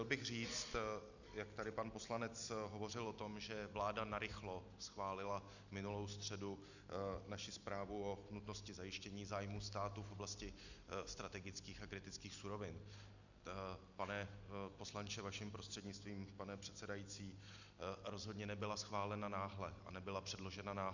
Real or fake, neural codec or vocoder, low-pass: real; none; 7.2 kHz